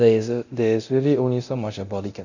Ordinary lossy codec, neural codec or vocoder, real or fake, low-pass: none; codec, 24 kHz, 0.9 kbps, DualCodec; fake; 7.2 kHz